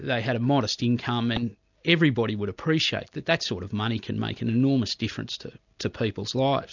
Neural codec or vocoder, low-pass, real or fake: none; 7.2 kHz; real